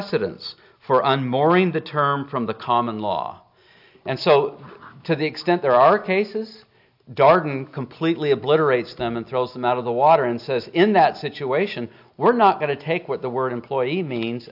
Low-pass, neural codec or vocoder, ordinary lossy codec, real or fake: 5.4 kHz; none; AAC, 48 kbps; real